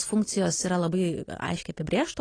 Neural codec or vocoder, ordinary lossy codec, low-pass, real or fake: none; AAC, 32 kbps; 9.9 kHz; real